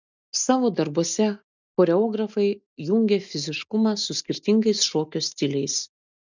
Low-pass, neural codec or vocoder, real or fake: 7.2 kHz; none; real